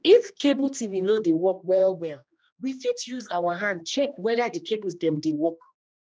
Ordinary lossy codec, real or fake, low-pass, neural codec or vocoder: none; fake; none; codec, 16 kHz, 1 kbps, X-Codec, HuBERT features, trained on general audio